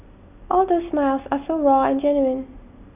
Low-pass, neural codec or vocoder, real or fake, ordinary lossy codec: 3.6 kHz; none; real; none